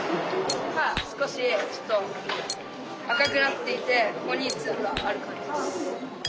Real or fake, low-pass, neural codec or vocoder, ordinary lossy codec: real; none; none; none